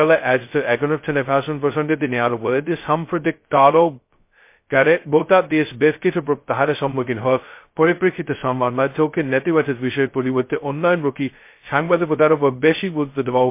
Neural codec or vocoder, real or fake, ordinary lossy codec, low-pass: codec, 16 kHz, 0.2 kbps, FocalCodec; fake; MP3, 24 kbps; 3.6 kHz